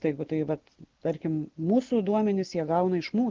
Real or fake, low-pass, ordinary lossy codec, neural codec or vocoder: real; 7.2 kHz; Opus, 16 kbps; none